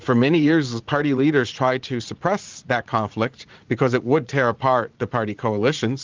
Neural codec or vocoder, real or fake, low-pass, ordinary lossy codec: vocoder, 44.1 kHz, 80 mel bands, Vocos; fake; 7.2 kHz; Opus, 32 kbps